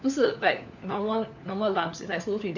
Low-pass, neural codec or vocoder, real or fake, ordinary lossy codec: 7.2 kHz; codec, 16 kHz, 4 kbps, FunCodec, trained on LibriTTS, 50 frames a second; fake; none